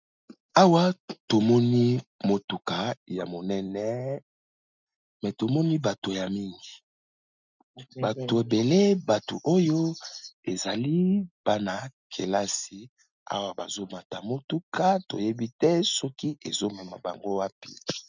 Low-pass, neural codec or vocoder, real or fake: 7.2 kHz; none; real